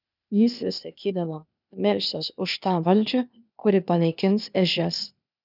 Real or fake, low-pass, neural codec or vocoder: fake; 5.4 kHz; codec, 16 kHz, 0.8 kbps, ZipCodec